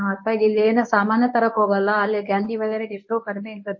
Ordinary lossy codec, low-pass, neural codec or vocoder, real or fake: MP3, 32 kbps; 7.2 kHz; codec, 24 kHz, 0.9 kbps, WavTokenizer, medium speech release version 1; fake